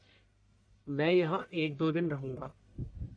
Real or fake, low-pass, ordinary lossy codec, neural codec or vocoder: fake; 9.9 kHz; AAC, 64 kbps; codec, 44.1 kHz, 1.7 kbps, Pupu-Codec